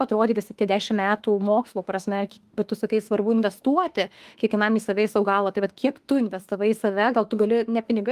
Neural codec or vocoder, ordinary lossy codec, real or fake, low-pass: autoencoder, 48 kHz, 32 numbers a frame, DAC-VAE, trained on Japanese speech; Opus, 24 kbps; fake; 14.4 kHz